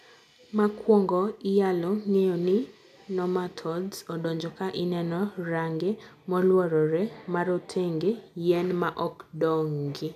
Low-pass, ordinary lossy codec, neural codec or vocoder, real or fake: 14.4 kHz; none; none; real